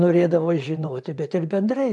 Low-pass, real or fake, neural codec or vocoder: 10.8 kHz; fake; vocoder, 24 kHz, 100 mel bands, Vocos